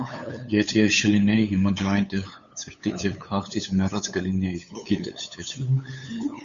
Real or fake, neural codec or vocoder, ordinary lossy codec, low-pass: fake; codec, 16 kHz, 8 kbps, FunCodec, trained on LibriTTS, 25 frames a second; Opus, 64 kbps; 7.2 kHz